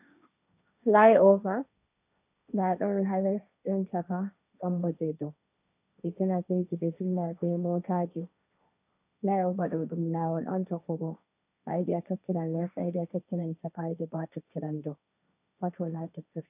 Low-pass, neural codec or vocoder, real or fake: 3.6 kHz; codec, 16 kHz, 1.1 kbps, Voila-Tokenizer; fake